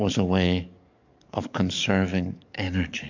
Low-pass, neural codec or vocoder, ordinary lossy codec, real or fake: 7.2 kHz; codec, 44.1 kHz, 7.8 kbps, DAC; MP3, 64 kbps; fake